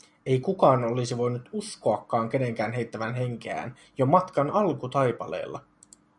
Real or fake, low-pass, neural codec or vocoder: real; 10.8 kHz; none